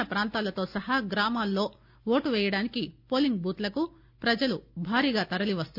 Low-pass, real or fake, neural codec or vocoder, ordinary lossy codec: 5.4 kHz; real; none; none